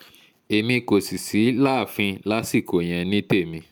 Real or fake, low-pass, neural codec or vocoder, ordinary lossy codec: real; none; none; none